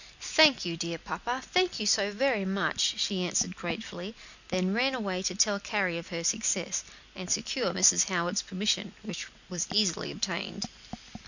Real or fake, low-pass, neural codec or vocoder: real; 7.2 kHz; none